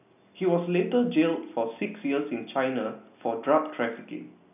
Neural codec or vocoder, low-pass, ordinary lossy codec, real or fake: none; 3.6 kHz; none; real